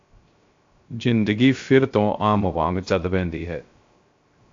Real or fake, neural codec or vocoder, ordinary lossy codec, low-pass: fake; codec, 16 kHz, 0.3 kbps, FocalCodec; AAC, 48 kbps; 7.2 kHz